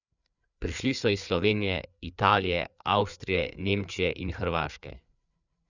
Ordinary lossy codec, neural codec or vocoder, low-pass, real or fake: none; codec, 16 kHz, 4 kbps, FreqCodec, larger model; 7.2 kHz; fake